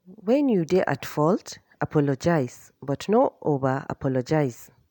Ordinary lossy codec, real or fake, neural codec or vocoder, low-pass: none; real; none; 19.8 kHz